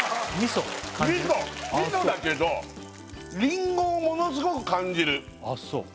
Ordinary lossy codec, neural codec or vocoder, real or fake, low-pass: none; none; real; none